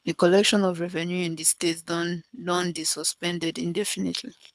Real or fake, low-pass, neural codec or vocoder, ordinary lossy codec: fake; none; codec, 24 kHz, 6 kbps, HILCodec; none